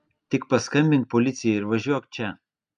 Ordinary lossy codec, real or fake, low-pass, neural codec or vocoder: MP3, 96 kbps; real; 9.9 kHz; none